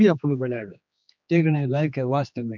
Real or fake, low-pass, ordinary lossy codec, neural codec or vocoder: fake; 7.2 kHz; none; codec, 16 kHz, 2 kbps, X-Codec, HuBERT features, trained on general audio